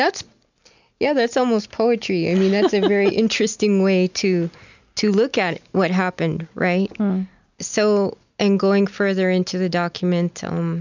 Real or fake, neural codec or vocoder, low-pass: real; none; 7.2 kHz